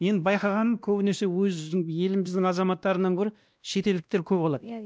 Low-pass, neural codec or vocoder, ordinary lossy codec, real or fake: none; codec, 16 kHz, 1 kbps, X-Codec, WavLM features, trained on Multilingual LibriSpeech; none; fake